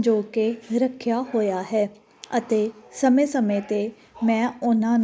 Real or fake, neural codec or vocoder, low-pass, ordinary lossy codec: real; none; none; none